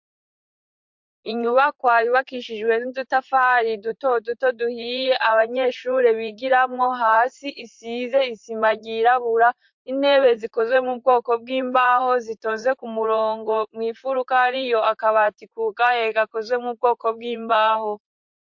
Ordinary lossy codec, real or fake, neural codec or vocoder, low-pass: MP3, 48 kbps; fake; vocoder, 44.1 kHz, 128 mel bands, Pupu-Vocoder; 7.2 kHz